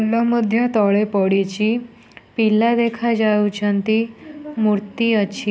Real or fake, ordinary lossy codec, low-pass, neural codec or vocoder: real; none; none; none